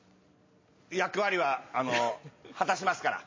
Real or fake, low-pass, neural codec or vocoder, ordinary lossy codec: real; 7.2 kHz; none; MP3, 32 kbps